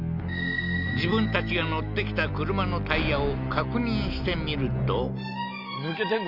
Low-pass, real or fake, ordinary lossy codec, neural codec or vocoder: 5.4 kHz; real; none; none